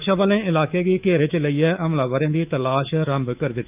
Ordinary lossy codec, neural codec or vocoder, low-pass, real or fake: Opus, 24 kbps; codec, 24 kHz, 3.1 kbps, DualCodec; 3.6 kHz; fake